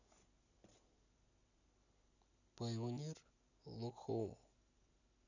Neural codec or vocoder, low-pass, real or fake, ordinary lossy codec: none; 7.2 kHz; real; none